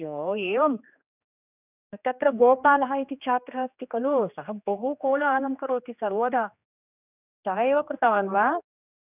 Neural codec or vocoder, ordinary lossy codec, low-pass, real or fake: codec, 16 kHz, 2 kbps, X-Codec, HuBERT features, trained on general audio; none; 3.6 kHz; fake